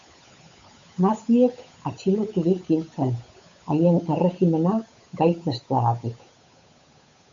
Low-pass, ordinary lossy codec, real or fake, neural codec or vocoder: 7.2 kHz; AAC, 64 kbps; fake; codec, 16 kHz, 8 kbps, FunCodec, trained on Chinese and English, 25 frames a second